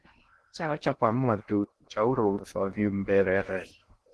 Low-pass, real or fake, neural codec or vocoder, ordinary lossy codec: 10.8 kHz; fake; codec, 16 kHz in and 24 kHz out, 0.6 kbps, FocalCodec, streaming, 2048 codes; Opus, 16 kbps